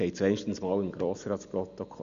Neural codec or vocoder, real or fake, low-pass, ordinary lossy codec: none; real; 7.2 kHz; Opus, 64 kbps